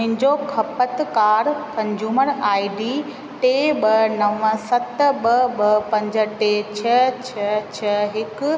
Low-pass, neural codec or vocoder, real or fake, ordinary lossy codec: none; none; real; none